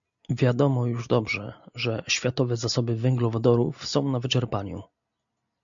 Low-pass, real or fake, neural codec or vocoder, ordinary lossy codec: 7.2 kHz; real; none; MP3, 64 kbps